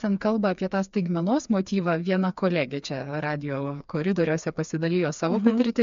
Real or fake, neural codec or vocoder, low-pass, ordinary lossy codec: fake; codec, 16 kHz, 4 kbps, FreqCodec, smaller model; 7.2 kHz; MP3, 64 kbps